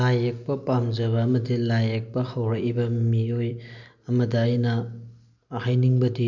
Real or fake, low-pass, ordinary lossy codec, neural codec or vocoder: real; 7.2 kHz; none; none